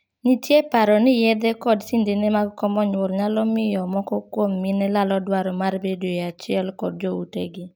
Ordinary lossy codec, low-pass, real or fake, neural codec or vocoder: none; none; real; none